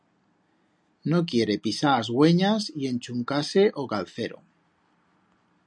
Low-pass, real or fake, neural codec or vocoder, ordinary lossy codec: 9.9 kHz; real; none; MP3, 96 kbps